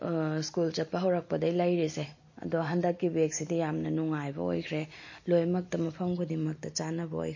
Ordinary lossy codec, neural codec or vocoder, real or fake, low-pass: MP3, 32 kbps; none; real; 7.2 kHz